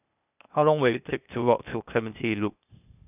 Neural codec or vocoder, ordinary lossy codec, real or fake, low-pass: codec, 16 kHz, 0.8 kbps, ZipCodec; none; fake; 3.6 kHz